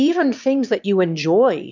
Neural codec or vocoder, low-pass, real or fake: autoencoder, 22.05 kHz, a latent of 192 numbers a frame, VITS, trained on one speaker; 7.2 kHz; fake